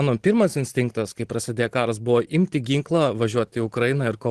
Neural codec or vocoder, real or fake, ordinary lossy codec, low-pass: none; real; Opus, 16 kbps; 10.8 kHz